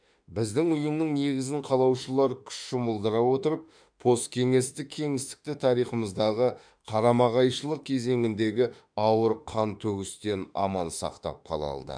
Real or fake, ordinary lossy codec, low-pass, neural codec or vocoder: fake; none; 9.9 kHz; autoencoder, 48 kHz, 32 numbers a frame, DAC-VAE, trained on Japanese speech